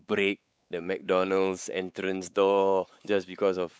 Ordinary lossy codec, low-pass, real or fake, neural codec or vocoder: none; none; fake; codec, 16 kHz, 4 kbps, X-Codec, WavLM features, trained on Multilingual LibriSpeech